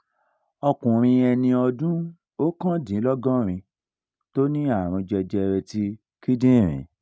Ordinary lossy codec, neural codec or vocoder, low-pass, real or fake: none; none; none; real